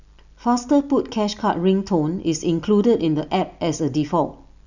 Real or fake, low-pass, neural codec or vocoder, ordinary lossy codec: real; 7.2 kHz; none; none